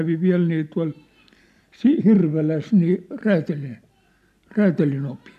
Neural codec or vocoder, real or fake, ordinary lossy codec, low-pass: none; real; MP3, 96 kbps; 14.4 kHz